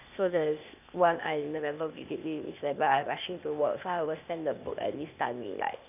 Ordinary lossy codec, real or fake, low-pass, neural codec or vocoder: none; fake; 3.6 kHz; codec, 16 kHz, 0.8 kbps, ZipCodec